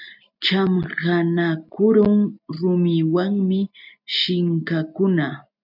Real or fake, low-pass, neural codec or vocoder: real; 5.4 kHz; none